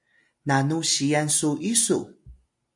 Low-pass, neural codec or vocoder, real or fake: 10.8 kHz; none; real